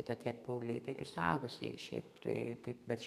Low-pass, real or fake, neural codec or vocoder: 14.4 kHz; fake; codec, 32 kHz, 1.9 kbps, SNAC